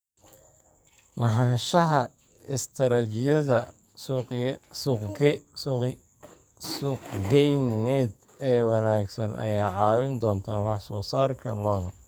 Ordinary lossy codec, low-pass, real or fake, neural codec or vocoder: none; none; fake; codec, 44.1 kHz, 2.6 kbps, SNAC